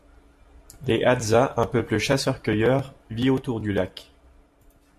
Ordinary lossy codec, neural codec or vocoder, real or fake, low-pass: MP3, 64 kbps; none; real; 14.4 kHz